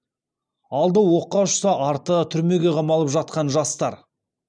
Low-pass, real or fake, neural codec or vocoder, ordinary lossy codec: 9.9 kHz; real; none; none